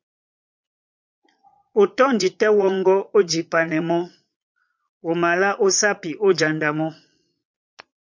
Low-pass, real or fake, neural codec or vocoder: 7.2 kHz; fake; vocoder, 22.05 kHz, 80 mel bands, Vocos